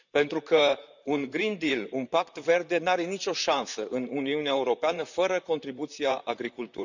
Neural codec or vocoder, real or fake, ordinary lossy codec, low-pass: vocoder, 44.1 kHz, 128 mel bands, Pupu-Vocoder; fake; none; 7.2 kHz